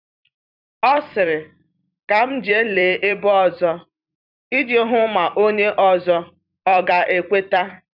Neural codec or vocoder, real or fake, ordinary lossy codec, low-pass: vocoder, 44.1 kHz, 128 mel bands every 256 samples, BigVGAN v2; fake; none; 5.4 kHz